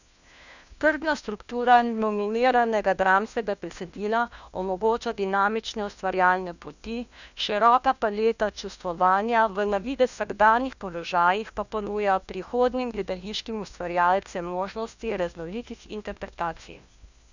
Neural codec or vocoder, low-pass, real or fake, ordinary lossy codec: codec, 16 kHz, 1 kbps, FunCodec, trained on LibriTTS, 50 frames a second; 7.2 kHz; fake; none